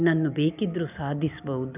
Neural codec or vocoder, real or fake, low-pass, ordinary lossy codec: none; real; 3.6 kHz; none